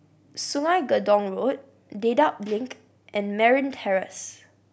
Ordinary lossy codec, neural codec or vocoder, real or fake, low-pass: none; none; real; none